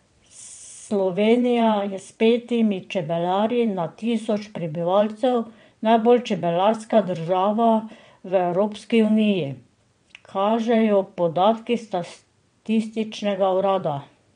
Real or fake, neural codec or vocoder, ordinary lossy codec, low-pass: fake; vocoder, 22.05 kHz, 80 mel bands, WaveNeXt; MP3, 64 kbps; 9.9 kHz